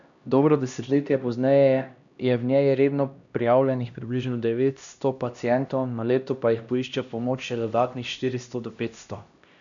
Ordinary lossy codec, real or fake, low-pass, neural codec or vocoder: none; fake; 7.2 kHz; codec, 16 kHz, 1 kbps, X-Codec, HuBERT features, trained on LibriSpeech